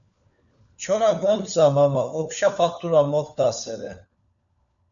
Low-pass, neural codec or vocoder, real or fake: 7.2 kHz; codec, 16 kHz, 4 kbps, FunCodec, trained on LibriTTS, 50 frames a second; fake